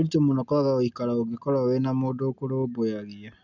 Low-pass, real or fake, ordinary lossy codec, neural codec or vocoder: 7.2 kHz; real; none; none